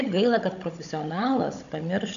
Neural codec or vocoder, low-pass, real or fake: codec, 16 kHz, 16 kbps, FunCodec, trained on Chinese and English, 50 frames a second; 7.2 kHz; fake